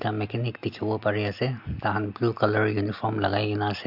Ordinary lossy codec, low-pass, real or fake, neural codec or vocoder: MP3, 48 kbps; 5.4 kHz; real; none